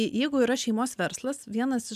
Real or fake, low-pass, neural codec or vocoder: real; 14.4 kHz; none